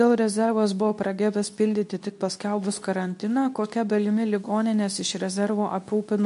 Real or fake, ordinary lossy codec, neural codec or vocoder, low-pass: fake; MP3, 64 kbps; codec, 24 kHz, 0.9 kbps, WavTokenizer, medium speech release version 2; 10.8 kHz